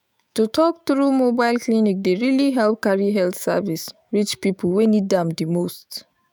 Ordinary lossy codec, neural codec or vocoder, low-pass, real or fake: none; autoencoder, 48 kHz, 128 numbers a frame, DAC-VAE, trained on Japanese speech; none; fake